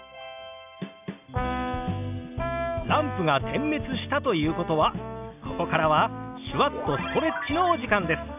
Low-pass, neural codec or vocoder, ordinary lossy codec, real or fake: 3.6 kHz; none; AAC, 32 kbps; real